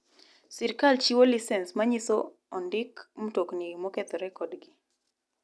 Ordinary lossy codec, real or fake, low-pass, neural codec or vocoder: none; real; none; none